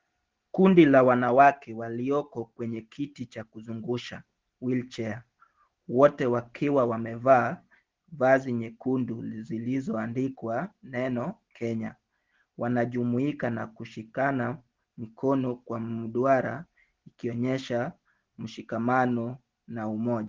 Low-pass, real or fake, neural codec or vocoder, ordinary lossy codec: 7.2 kHz; real; none; Opus, 16 kbps